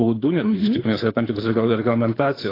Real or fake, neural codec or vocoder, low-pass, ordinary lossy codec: fake; codec, 24 kHz, 6 kbps, HILCodec; 5.4 kHz; AAC, 24 kbps